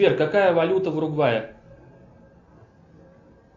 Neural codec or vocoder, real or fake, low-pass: none; real; 7.2 kHz